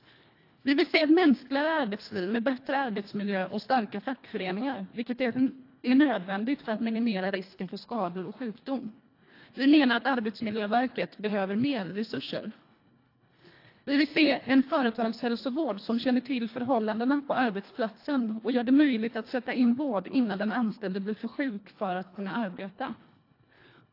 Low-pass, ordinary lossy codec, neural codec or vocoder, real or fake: 5.4 kHz; AAC, 32 kbps; codec, 24 kHz, 1.5 kbps, HILCodec; fake